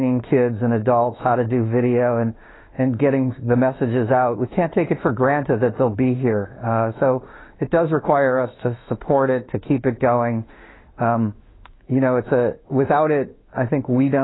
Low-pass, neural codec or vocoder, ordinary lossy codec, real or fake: 7.2 kHz; autoencoder, 48 kHz, 32 numbers a frame, DAC-VAE, trained on Japanese speech; AAC, 16 kbps; fake